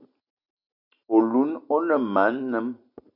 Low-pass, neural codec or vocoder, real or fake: 5.4 kHz; none; real